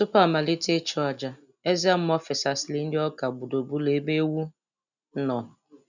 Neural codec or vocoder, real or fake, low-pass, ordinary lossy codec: none; real; 7.2 kHz; none